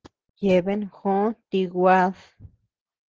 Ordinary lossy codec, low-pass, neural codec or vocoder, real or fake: Opus, 16 kbps; 7.2 kHz; none; real